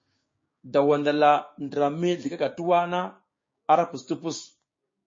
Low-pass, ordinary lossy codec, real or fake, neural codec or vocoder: 7.2 kHz; MP3, 32 kbps; fake; codec, 16 kHz, 6 kbps, DAC